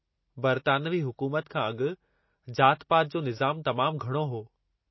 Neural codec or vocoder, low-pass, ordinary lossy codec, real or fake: none; 7.2 kHz; MP3, 24 kbps; real